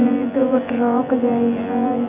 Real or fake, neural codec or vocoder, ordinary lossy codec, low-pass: fake; vocoder, 24 kHz, 100 mel bands, Vocos; none; 3.6 kHz